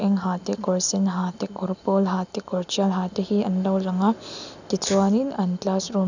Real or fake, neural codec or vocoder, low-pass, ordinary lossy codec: real; none; 7.2 kHz; none